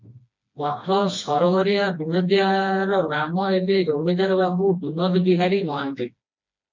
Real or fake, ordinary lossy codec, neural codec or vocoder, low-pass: fake; MP3, 48 kbps; codec, 16 kHz, 2 kbps, FreqCodec, smaller model; 7.2 kHz